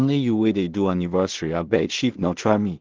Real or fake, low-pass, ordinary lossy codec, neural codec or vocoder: fake; 7.2 kHz; Opus, 16 kbps; codec, 16 kHz in and 24 kHz out, 0.4 kbps, LongCat-Audio-Codec, two codebook decoder